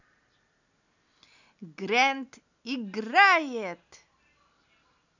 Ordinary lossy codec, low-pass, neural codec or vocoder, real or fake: none; 7.2 kHz; none; real